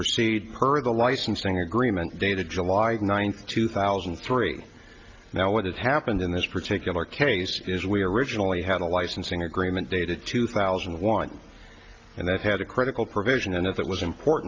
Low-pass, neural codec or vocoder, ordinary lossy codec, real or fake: 7.2 kHz; none; Opus, 24 kbps; real